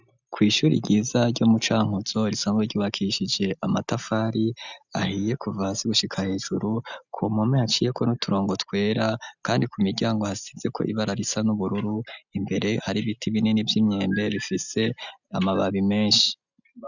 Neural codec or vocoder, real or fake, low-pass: none; real; 7.2 kHz